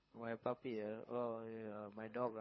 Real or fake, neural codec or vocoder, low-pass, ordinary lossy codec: fake; codec, 24 kHz, 6 kbps, HILCodec; 7.2 kHz; MP3, 24 kbps